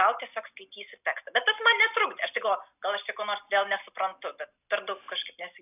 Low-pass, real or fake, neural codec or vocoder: 3.6 kHz; real; none